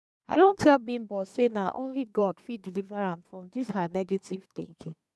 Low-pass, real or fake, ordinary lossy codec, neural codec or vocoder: none; fake; none; codec, 24 kHz, 1 kbps, SNAC